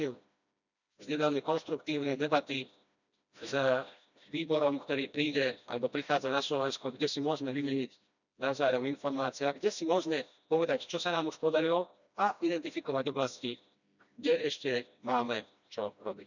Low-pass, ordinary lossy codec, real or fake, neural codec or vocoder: 7.2 kHz; none; fake; codec, 16 kHz, 1 kbps, FreqCodec, smaller model